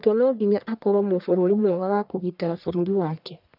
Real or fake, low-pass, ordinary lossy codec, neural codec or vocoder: fake; 5.4 kHz; none; codec, 44.1 kHz, 1.7 kbps, Pupu-Codec